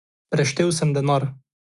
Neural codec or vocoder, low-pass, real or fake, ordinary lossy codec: none; 10.8 kHz; real; Opus, 64 kbps